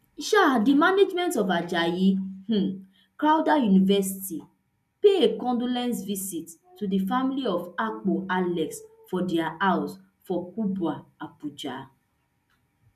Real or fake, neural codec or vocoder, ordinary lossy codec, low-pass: real; none; none; 14.4 kHz